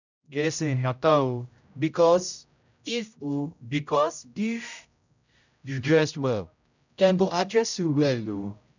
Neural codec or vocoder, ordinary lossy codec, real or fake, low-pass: codec, 16 kHz, 0.5 kbps, X-Codec, HuBERT features, trained on general audio; none; fake; 7.2 kHz